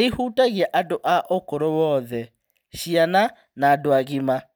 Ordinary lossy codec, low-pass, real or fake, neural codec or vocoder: none; none; real; none